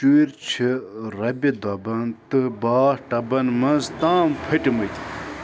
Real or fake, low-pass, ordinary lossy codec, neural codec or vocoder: real; none; none; none